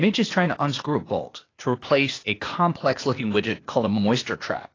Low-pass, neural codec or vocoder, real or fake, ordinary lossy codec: 7.2 kHz; codec, 16 kHz, 0.8 kbps, ZipCodec; fake; AAC, 32 kbps